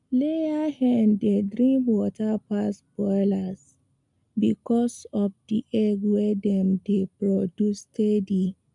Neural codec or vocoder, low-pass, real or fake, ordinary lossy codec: none; 10.8 kHz; real; none